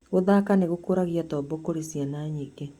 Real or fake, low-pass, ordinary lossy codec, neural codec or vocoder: real; 19.8 kHz; Opus, 64 kbps; none